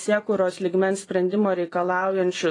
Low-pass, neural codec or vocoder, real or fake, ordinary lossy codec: 10.8 kHz; vocoder, 24 kHz, 100 mel bands, Vocos; fake; AAC, 32 kbps